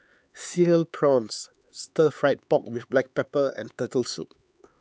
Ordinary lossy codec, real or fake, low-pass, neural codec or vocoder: none; fake; none; codec, 16 kHz, 4 kbps, X-Codec, HuBERT features, trained on LibriSpeech